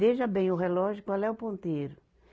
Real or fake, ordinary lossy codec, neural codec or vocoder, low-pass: real; none; none; none